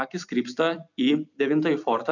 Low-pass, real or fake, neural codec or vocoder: 7.2 kHz; fake; vocoder, 44.1 kHz, 128 mel bands every 256 samples, BigVGAN v2